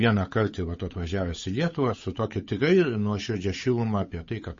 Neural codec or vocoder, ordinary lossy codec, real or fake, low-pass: codec, 16 kHz, 8 kbps, FunCodec, trained on Chinese and English, 25 frames a second; MP3, 32 kbps; fake; 7.2 kHz